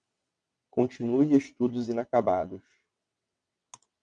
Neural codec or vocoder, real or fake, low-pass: vocoder, 22.05 kHz, 80 mel bands, WaveNeXt; fake; 9.9 kHz